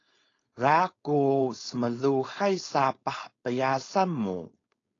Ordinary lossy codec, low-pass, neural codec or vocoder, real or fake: AAC, 32 kbps; 7.2 kHz; codec, 16 kHz, 4.8 kbps, FACodec; fake